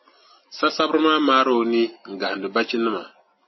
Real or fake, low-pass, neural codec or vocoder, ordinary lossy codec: real; 7.2 kHz; none; MP3, 24 kbps